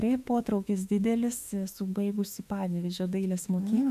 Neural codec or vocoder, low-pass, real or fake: autoencoder, 48 kHz, 32 numbers a frame, DAC-VAE, trained on Japanese speech; 14.4 kHz; fake